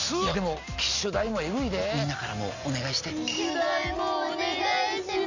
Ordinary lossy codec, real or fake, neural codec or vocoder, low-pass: none; real; none; 7.2 kHz